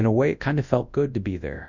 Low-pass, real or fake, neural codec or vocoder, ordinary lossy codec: 7.2 kHz; fake; codec, 24 kHz, 0.9 kbps, WavTokenizer, large speech release; Opus, 64 kbps